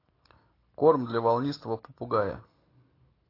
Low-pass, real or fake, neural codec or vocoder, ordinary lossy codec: 5.4 kHz; real; none; AAC, 24 kbps